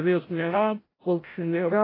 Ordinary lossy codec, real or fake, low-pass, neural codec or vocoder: AAC, 24 kbps; fake; 5.4 kHz; codec, 16 kHz, 0.5 kbps, FreqCodec, larger model